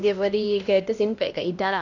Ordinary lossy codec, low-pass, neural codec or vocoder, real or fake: none; 7.2 kHz; codec, 16 kHz, 1 kbps, X-Codec, HuBERT features, trained on LibriSpeech; fake